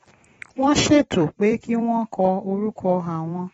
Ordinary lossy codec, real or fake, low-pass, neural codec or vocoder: AAC, 24 kbps; fake; 19.8 kHz; vocoder, 44.1 kHz, 128 mel bands every 256 samples, BigVGAN v2